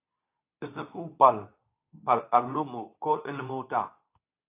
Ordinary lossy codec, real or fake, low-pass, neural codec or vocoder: AAC, 24 kbps; fake; 3.6 kHz; codec, 24 kHz, 0.9 kbps, WavTokenizer, medium speech release version 2